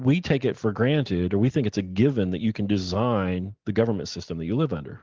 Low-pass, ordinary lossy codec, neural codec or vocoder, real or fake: 7.2 kHz; Opus, 16 kbps; none; real